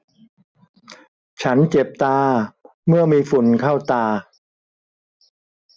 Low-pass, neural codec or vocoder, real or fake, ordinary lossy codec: none; none; real; none